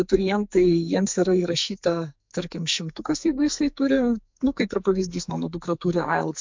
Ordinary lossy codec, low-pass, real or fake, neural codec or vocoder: MP3, 64 kbps; 7.2 kHz; fake; codec, 44.1 kHz, 2.6 kbps, SNAC